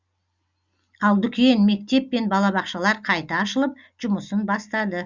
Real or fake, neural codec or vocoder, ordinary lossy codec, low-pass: real; none; Opus, 64 kbps; 7.2 kHz